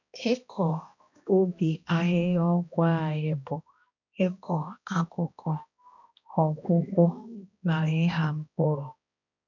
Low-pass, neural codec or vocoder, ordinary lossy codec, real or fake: 7.2 kHz; codec, 16 kHz, 1 kbps, X-Codec, HuBERT features, trained on balanced general audio; none; fake